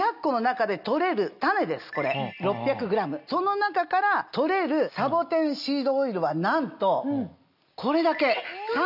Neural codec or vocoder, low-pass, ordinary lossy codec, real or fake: none; 5.4 kHz; none; real